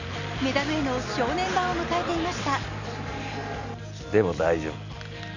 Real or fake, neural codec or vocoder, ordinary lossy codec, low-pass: real; none; none; 7.2 kHz